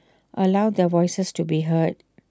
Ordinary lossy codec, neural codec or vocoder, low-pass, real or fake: none; none; none; real